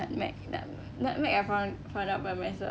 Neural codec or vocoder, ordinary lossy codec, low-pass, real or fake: none; none; none; real